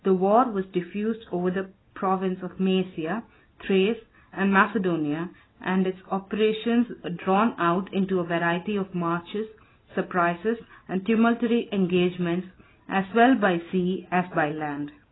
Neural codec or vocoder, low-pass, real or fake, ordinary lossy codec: none; 7.2 kHz; real; AAC, 16 kbps